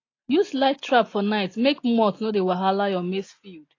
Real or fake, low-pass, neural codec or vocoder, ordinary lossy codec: real; 7.2 kHz; none; AAC, 32 kbps